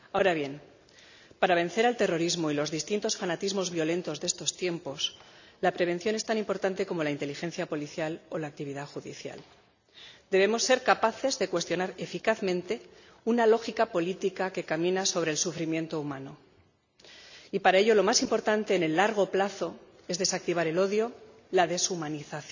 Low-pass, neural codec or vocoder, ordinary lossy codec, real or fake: 7.2 kHz; none; none; real